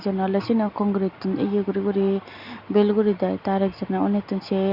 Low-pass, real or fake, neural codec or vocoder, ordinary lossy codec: 5.4 kHz; real; none; Opus, 64 kbps